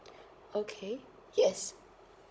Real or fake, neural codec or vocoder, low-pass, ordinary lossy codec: fake; codec, 16 kHz, 16 kbps, FunCodec, trained on Chinese and English, 50 frames a second; none; none